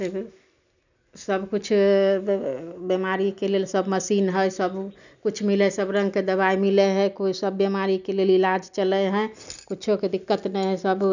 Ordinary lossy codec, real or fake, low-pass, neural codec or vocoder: none; real; 7.2 kHz; none